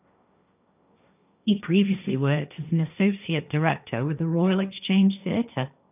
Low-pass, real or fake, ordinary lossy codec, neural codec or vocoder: 3.6 kHz; fake; none; codec, 16 kHz, 1.1 kbps, Voila-Tokenizer